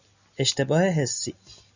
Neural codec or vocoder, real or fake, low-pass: none; real; 7.2 kHz